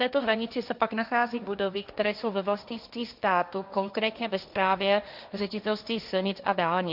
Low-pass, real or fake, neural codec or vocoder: 5.4 kHz; fake; codec, 16 kHz, 1.1 kbps, Voila-Tokenizer